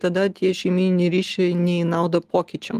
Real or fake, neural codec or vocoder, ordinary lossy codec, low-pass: real; none; Opus, 24 kbps; 14.4 kHz